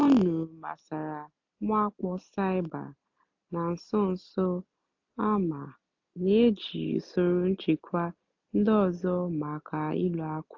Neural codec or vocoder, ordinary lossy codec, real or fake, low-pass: none; AAC, 48 kbps; real; 7.2 kHz